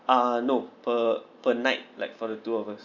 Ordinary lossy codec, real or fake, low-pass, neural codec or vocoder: none; real; 7.2 kHz; none